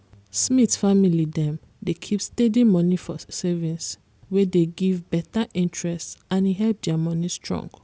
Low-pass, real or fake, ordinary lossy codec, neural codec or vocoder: none; real; none; none